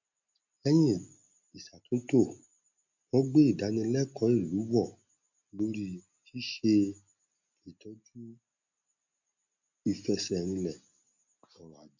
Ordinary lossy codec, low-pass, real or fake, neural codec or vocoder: none; 7.2 kHz; real; none